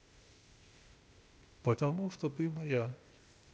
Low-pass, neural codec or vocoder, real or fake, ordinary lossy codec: none; codec, 16 kHz, 0.8 kbps, ZipCodec; fake; none